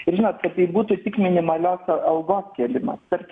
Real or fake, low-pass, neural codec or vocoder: real; 9.9 kHz; none